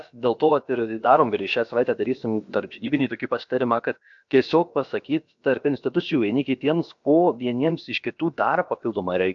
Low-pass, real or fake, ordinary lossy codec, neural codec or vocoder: 7.2 kHz; fake; AAC, 64 kbps; codec, 16 kHz, about 1 kbps, DyCAST, with the encoder's durations